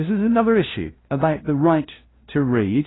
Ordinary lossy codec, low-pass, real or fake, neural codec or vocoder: AAC, 16 kbps; 7.2 kHz; fake; codec, 16 kHz, 0.5 kbps, FunCodec, trained on LibriTTS, 25 frames a second